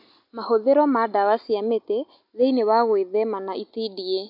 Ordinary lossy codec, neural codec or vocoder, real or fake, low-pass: MP3, 48 kbps; none; real; 5.4 kHz